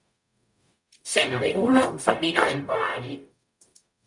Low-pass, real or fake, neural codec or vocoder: 10.8 kHz; fake; codec, 44.1 kHz, 0.9 kbps, DAC